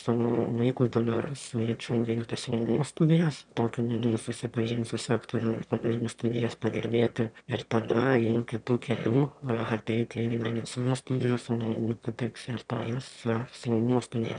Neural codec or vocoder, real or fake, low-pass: autoencoder, 22.05 kHz, a latent of 192 numbers a frame, VITS, trained on one speaker; fake; 9.9 kHz